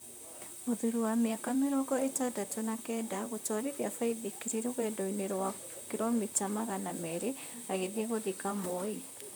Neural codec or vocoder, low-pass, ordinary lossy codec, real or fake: vocoder, 44.1 kHz, 128 mel bands, Pupu-Vocoder; none; none; fake